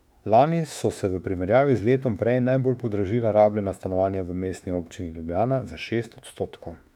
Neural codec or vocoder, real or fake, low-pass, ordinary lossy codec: autoencoder, 48 kHz, 32 numbers a frame, DAC-VAE, trained on Japanese speech; fake; 19.8 kHz; none